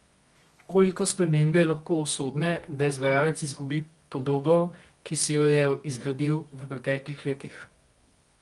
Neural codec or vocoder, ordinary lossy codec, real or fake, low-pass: codec, 24 kHz, 0.9 kbps, WavTokenizer, medium music audio release; Opus, 32 kbps; fake; 10.8 kHz